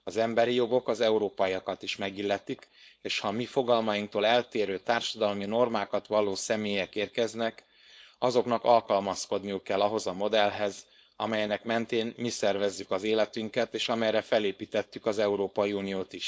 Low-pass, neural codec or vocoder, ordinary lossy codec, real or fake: none; codec, 16 kHz, 4.8 kbps, FACodec; none; fake